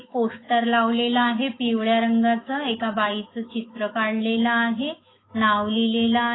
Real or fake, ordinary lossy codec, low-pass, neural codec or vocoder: fake; AAC, 16 kbps; 7.2 kHz; autoencoder, 48 kHz, 128 numbers a frame, DAC-VAE, trained on Japanese speech